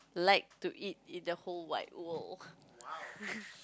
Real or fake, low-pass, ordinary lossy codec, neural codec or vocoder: real; none; none; none